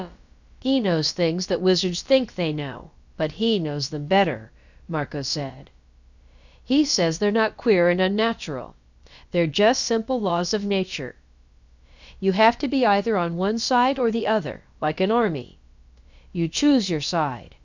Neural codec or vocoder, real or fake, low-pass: codec, 16 kHz, about 1 kbps, DyCAST, with the encoder's durations; fake; 7.2 kHz